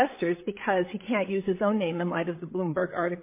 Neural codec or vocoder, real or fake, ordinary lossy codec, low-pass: codec, 16 kHz, 8 kbps, FreqCodec, larger model; fake; MP3, 24 kbps; 3.6 kHz